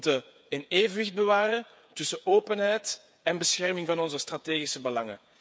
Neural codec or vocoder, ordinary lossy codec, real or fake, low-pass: codec, 16 kHz, 8 kbps, FreqCodec, smaller model; none; fake; none